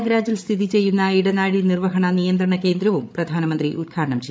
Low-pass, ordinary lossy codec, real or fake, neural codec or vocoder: none; none; fake; codec, 16 kHz, 8 kbps, FreqCodec, larger model